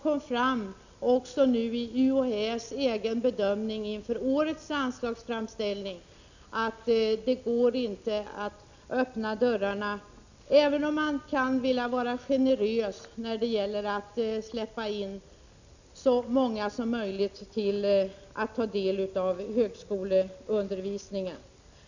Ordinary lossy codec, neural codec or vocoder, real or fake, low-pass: none; none; real; 7.2 kHz